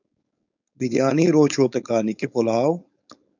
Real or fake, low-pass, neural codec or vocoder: fake; 7.2 kHz; codec, 16 kHz, 4.8 kbps, FACodec